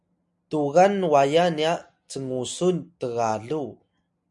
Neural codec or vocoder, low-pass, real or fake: none; 10.8 kHz; real